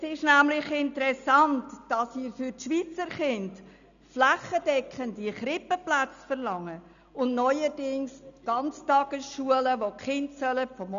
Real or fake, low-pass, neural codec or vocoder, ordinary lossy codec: real; 7.2 kHz; none; none